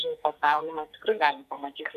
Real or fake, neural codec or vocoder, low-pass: fake; codec, 44.1 kHz, 2.6 kbps, SNAC; 14.4 kHz